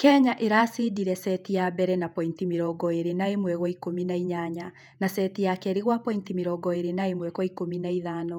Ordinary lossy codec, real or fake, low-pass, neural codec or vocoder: none; fake; 19.8 kHz; vocoder, 48 kHz, 128 mel bands, Vocos